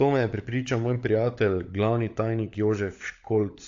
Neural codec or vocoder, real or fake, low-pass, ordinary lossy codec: codec, 16 kHz, 16 kbps, FunCodec, trained on LibriTTS, 50 frames a second; fake; 7.2 kHz; AAC, 48 kbps